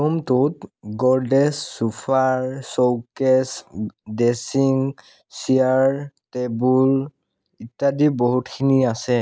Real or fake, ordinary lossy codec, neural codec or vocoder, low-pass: real; none; none; none